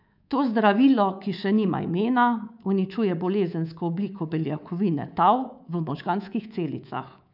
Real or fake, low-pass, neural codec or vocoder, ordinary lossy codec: fake; 5.4 kHz; codec, 24 kHz, 3.1 kbps, DualCodec; none